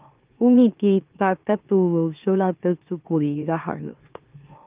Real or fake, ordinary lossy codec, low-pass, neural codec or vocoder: fake; Opus, 24 kbps; 3.6 kHz; autoencoder, 44.1 kHz, a latent of 192 numbers a frame, MeloTTS